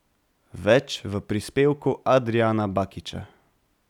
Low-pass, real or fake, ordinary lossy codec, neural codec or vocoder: 19.8 kHz; real; none; none